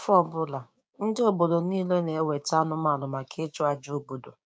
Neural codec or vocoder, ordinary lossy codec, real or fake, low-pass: codec, 16 kHz, 6 kbps, DAC; none; fake; none